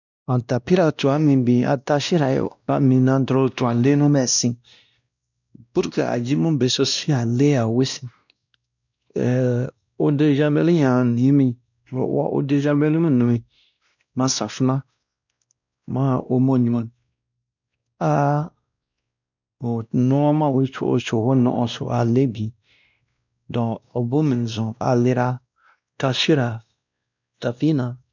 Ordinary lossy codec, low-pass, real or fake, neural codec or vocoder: none; 7.2 kHz; fake; codec, 16 kHz, 1 kbps, X-Codec, WavLM features, trained on Multilingual LibriSpeech